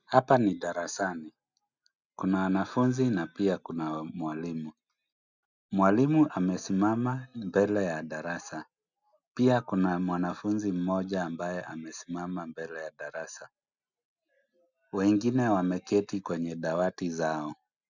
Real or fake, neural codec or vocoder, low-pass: real; none; 7.2 kHz